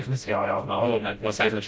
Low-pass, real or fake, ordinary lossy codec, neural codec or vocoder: none; fake; none; codec, 16 kHz, 0.5 kbps, FreqCodec, smaller model